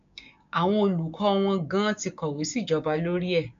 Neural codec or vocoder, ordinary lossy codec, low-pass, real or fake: codec, 16 kHz, 6 kbps, DAC; AAC, 64 kbps; 7.2 kHz; fake